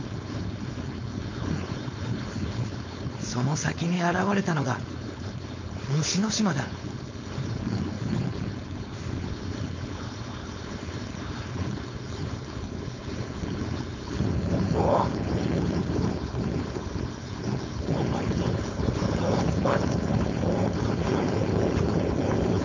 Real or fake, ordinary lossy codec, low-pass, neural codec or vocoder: fake; MP3, 64 kbps; 7.2 kHz; codec, 16 kHz, 4.8 kbps, FACodec